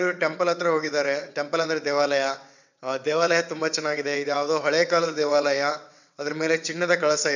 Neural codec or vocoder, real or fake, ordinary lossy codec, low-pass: vocoder, 22.05 kHz, 80 mel bands, WaveNeXt; fake; none; 7.2 kHz